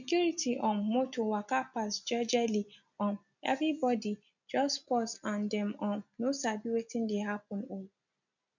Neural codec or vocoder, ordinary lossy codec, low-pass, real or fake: none; none; 7.2 kHz; real